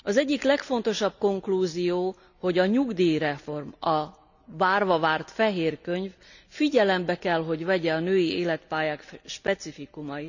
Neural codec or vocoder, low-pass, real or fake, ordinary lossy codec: none; 7.2 kHz; real; none